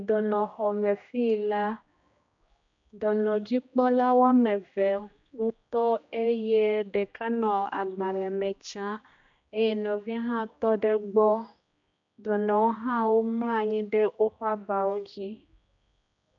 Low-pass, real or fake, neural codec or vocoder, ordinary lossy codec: 7.2 kHz; fake; codec, 16 kHz, 1 kbps, X-Codec, HuBERT features, trained on general audio; MP3, 64 kbps